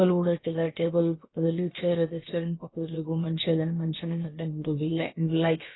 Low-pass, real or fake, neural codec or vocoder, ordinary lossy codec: 7.2 kHz; fake; codec, 16 kHz, about 1 kbps, DyCAST, with the encoder's durations; AAC, 16 kbps